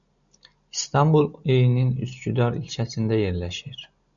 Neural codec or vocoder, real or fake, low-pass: none; real; 7.2 kHz